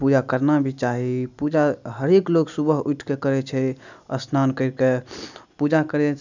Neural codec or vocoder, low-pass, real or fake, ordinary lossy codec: none; 7.2 kHz; real; none